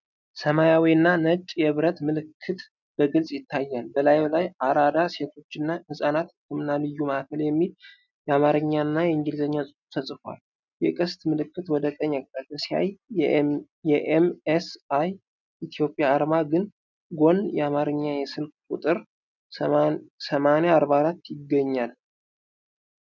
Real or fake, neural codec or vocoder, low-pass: real; none; 7.2 kHz